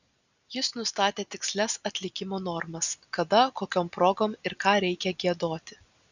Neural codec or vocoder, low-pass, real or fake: none; 7.2 kHz; real